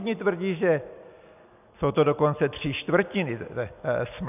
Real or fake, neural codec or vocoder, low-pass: real; none; 3.6 kHz